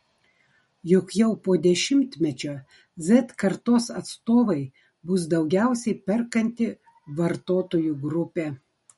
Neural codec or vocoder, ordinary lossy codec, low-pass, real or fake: none; MP3, 48 kbps; 19.8 kHz; real